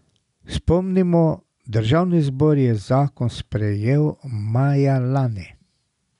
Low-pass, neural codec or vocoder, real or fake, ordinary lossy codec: 10.8 kHz; none; real; none